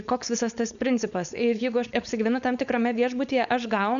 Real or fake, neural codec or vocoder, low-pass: fake; codec, 16 kHz, 4.8 kbps, FACodec; 7.2 kHz